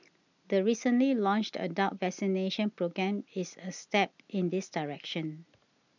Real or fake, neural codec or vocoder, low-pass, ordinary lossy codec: real; none; 7.2 kHz; none